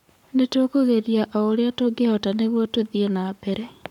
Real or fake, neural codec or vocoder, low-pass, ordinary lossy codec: fake; codec, 44.1 kHz, 7.8 kbps, Pupu-Codec; 19.8 kHz; none